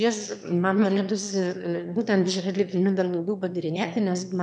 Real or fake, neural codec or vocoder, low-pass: fake; autoencoder, 22.05 kHz, a latent of 192 numbers a frame, VITS, trained on one speaker; 9.9 kHz